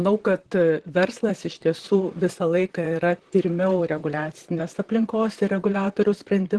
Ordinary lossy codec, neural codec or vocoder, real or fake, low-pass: Opus, 16 kbps; vocoder, 44.1 kHz, 128 mel bands, Pupu-Vocoder; fake; 10.8 kHz